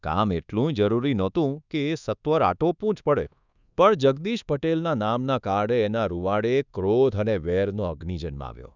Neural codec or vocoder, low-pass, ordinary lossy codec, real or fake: codec, 24 kHz, 1.2 kbps, DualCodec; 7.2 kHz; none; fake